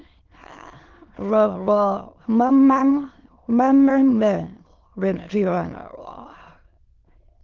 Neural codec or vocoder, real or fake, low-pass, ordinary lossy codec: autoencoder, 22.05 kHz, a latent of 192 numbers a frame, VITS, trained on many speakers; fake; 7.2 kHz; Opus, 16 kbps